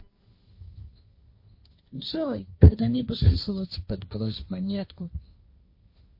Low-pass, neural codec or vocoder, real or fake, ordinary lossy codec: 5.4 kHz; codec, 16 kHz, 1.1 kbps, Voila-Tokenizer; fake; MP3, 32 kbps